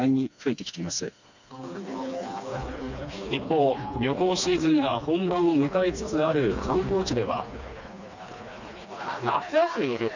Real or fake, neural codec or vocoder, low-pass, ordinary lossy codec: fake; codec, 16 kHz, 2 kbps, FreqCodec, smaller model; 7.2 kHz; none